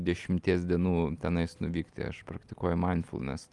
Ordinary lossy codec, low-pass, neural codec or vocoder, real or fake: Opus, 32 kbps; 10.8 kHz; none; real